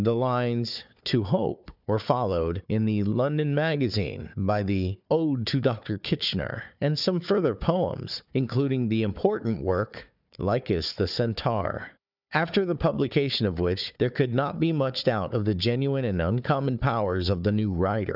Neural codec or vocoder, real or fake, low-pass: codec, 16 kHz, 4 kbps, FunCodec, trained on Chinese and English, 50 frames a second; fake; 5.4 kHz